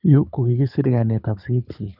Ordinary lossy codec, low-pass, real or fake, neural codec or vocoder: none; 5.4 kHz; fake; codec, 24 kHz, 6 kbps, HILCodec